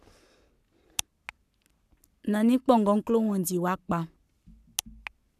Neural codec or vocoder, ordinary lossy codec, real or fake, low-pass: codec, 44.1 kHz, 7.8 kbps, Pupu-Codec; none; fake; 14.4 kHz